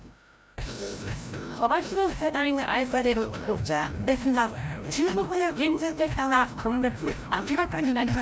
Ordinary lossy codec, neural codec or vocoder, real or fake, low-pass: none; codec, 16 kHz, 0.5 kbps, FreqCodec, larger model; fake; none